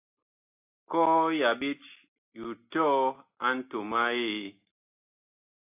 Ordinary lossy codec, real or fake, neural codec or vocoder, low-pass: AAC, 32 kbps; real; none; 3.6 kHz